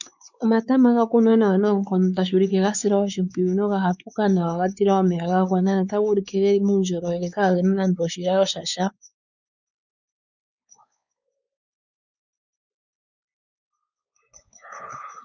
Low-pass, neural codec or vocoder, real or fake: 7.2 kHz; codec, 16 kHz, 4 kbps, X-Codec, WavLM features, trained on Multilingual LibriSpeech; fake